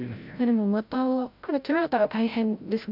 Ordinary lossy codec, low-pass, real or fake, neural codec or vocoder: none; 5.4 kHz; fake; codec, 16 kHz, 0.5 kbps, FreqCodec, larger model